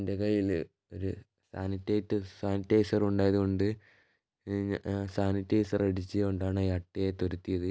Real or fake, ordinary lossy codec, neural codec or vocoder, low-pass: real; none; none; none